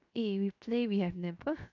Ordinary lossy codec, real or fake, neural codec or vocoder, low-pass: none; fake; codec, 16 kHz, 0.7 kbps, FocalCodec; 7.2 kHz